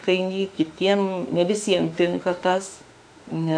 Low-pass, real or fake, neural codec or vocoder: 9.9 kHz; fake; autoencoder, 48 kHz, 32 numbers a frame, DAC-VAE, trained on Japanese speech